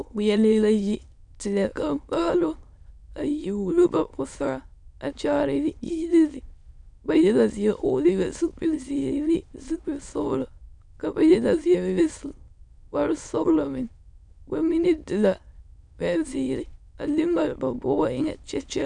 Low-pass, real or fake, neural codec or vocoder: 9.9 kHz; fake; autoencoder, 22.05 kHz, a latent of 192 numbers a frame, VITS, trained on many speakers